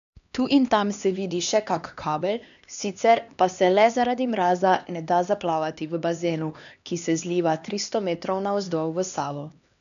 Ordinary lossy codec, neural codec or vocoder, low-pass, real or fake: none; codec, 16 kHz, 2 kbps, X-Codec, HuBERT features, trained on LibriSpeech; 7.2 kHz; fake